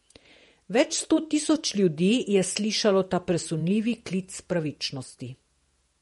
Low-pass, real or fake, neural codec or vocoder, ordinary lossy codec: 19.8 kHz; fake; vocoder, 48 kHz, 128 mel bands, Vocos; MP3, 48 kbps